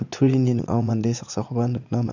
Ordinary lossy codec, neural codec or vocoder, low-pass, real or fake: none; vocoder, 44.1 kHz, 80 mel bands, Vocos; 7.2 kHz; fake